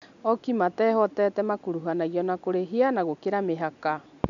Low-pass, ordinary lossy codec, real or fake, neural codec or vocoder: 7.2 kHz; none; real; none